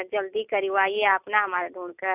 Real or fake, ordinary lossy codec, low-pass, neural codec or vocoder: real; none; 3.6 kHz; none